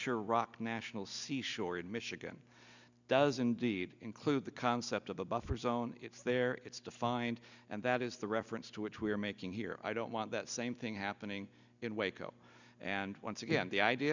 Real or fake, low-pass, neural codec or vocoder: real; 7.2 kHz; none